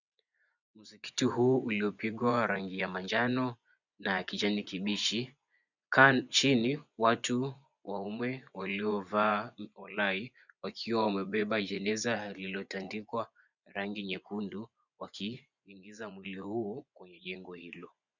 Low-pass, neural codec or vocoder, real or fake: 7.2 kHz; vocoder, 24 kHz, 100 mel bands, Vocos; fake